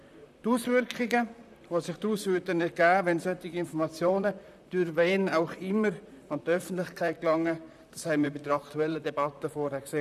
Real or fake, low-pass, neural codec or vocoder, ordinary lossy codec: fake; 14.4 kHz; vocoder, 44.1 kHz, 128 mel bands, Pupu-Vocoder; none